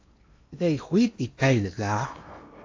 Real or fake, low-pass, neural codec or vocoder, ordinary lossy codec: fake; 7.2 kHz; codec, 16 kHz in and 24 kHz out, 0.8 kbps, FocalCodec, streaming, 65536 codes; AAC, 48 kbps